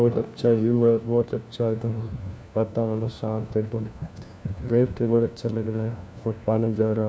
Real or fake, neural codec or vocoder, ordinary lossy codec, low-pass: fake; codec, 16 kHz, 1 kbps, FunCodec, trained on LibriTTS, 50 frames a second; none; none